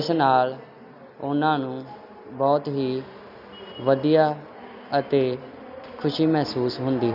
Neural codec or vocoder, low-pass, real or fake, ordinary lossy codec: none; 5.4 kHz; real; none